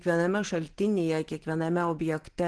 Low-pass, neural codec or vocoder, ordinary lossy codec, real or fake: 10.8 kHz; none; Opus, 16 kbps; real